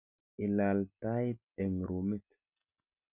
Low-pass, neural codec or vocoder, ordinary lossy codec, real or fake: 3.6 kHz; none; none; real